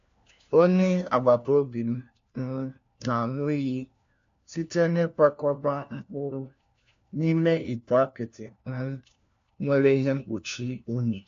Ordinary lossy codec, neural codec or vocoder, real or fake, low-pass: AAC, 64 kbps; codec, 16 kHz, 1 kbps, FunCodec, trained on LibriTTS, 50 frames a second; fake; 7.2 kHz